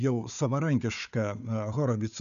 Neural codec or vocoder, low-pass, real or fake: codec, 16 kHz, 4 kbps, FunCodec, trained on Chinese and English, 50 frames a second; 7.2 kHz; fake